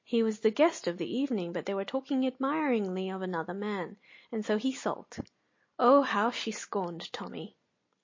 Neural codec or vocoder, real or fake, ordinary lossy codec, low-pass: none; real; MP3, 32 kbps; 7.2 kHz